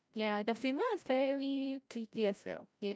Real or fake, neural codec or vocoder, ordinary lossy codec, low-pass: fake; codec, 16 kHz, 0.5 kbps, FreqCodec, larger model; none; none